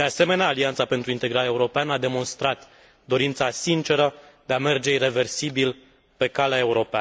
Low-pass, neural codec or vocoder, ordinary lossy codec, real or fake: none; none; none; real